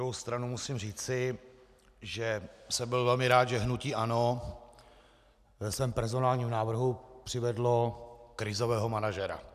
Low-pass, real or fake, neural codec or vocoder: 14.4 kHz; real; none